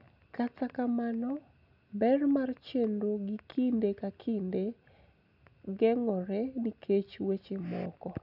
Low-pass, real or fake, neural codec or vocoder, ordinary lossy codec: 5.4 kHz; real; none; none